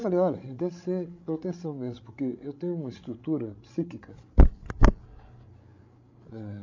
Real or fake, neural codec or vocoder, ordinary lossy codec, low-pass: fake; codec, 16 kHz, 16 kbps, FreqCodec, larger model; MP3, 64 kbps; 7.2 kHz